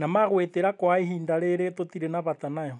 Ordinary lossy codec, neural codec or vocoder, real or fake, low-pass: none; none; real; 10.8 kHz